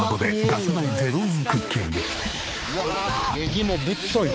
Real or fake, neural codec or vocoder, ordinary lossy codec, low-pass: fake; codec, 16 kHz, 4 kbps, X-Codec, HuBERT features, trained on balanced general audio; none; none